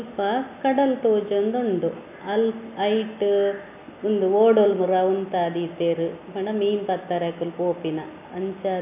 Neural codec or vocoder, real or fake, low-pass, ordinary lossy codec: none; real; 3.6 kHz; none